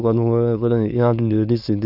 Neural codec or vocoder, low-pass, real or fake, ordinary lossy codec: autoencoder, 22.05 kHz, a latent of 192 numbers a frame, VITS, trained on many speakers; 5.4 kHz; fake; none